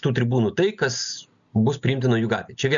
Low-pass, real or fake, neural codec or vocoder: 7.2 kHz; real; none